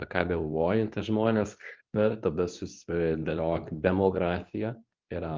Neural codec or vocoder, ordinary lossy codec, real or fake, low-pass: codec, 24 kHz, 0.9 kbps, WavTokenizer, medium speech release version 2; Opus, 32 kbps; fake; 7.2 kHz